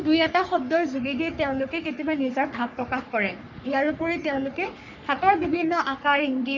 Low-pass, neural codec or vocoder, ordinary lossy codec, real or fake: 7.2 kHz; codec, 44.1 kHz, 3.4 kbps, Pupu-Codec; none; fake